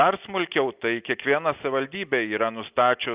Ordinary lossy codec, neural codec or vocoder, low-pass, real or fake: Opus, 24 kbps; none; 3.6 kHz; real